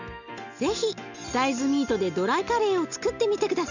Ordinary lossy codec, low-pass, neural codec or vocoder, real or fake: none; 7.2 kHz; none; real